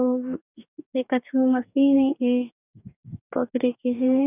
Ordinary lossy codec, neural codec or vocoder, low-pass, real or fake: none; codec, 44.1 kHz, 2.6 kbps, DAC; 3.6 kHz; fake